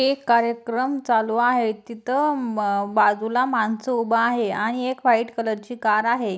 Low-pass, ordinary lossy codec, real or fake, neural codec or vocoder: none; none; real; none